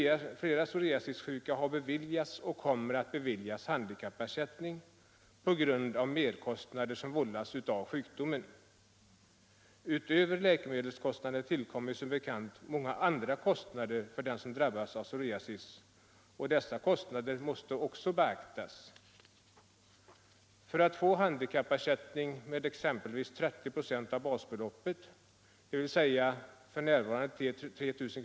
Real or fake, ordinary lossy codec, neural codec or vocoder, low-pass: real; none; none; none